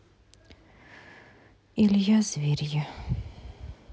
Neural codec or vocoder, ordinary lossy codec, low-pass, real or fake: none; none; none; real